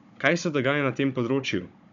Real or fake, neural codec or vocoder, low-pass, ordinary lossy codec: fake; codec, 16 kHz, 16 kbps, FunCodec, trained on Chinese and English, 50 frames a second; 7.2 kHz; none